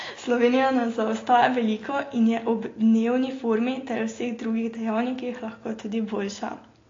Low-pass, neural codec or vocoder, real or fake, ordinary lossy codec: 7.2 kHz; none; real; AAC, 32 kbps